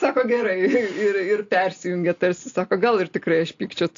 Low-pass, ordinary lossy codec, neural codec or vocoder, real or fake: 7.2 kHz; AAC, 48 kbps; none; real